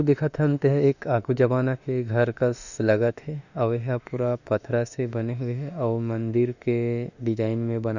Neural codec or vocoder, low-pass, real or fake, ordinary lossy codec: autoencoder, 48 kHz, 32 numbers a frame, DAC-VAE, trained on Japanese speech; 7.2 kHz; fake; none